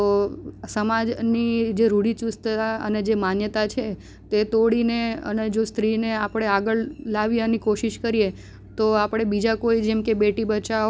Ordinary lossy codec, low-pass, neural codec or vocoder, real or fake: none; none; none; real